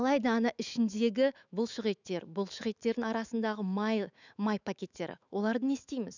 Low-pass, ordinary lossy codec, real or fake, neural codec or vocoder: 7.2 kHz; none; fake; autoencoder, 48 kHz, 128 numbers a frame, DAC-VAE, trained on Japanese speech